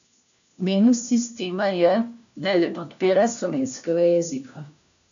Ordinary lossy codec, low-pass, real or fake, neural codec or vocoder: MP3, 96 kbps; 7.2 kHz; fake; codec, 16 kHz, 1 kbps, FunCodec, trained on LibriTTS, 50 frames a second